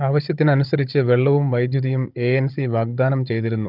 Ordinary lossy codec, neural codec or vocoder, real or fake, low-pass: Opus, 24 kbps; codec, 16 kHz, 16 kbps, FunCodec, trained on Chinese and English, 50 frames a second; fake; 5.4 kHz